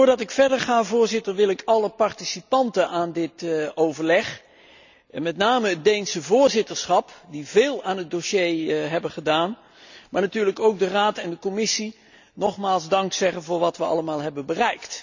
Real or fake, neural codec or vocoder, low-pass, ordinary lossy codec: real; none; 7.2 kHz; none